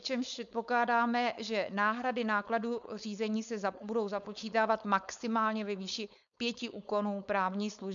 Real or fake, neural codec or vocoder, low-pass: fake; codec, 16 kHz, 4.8 kbps, FACodec; 7.2 kHz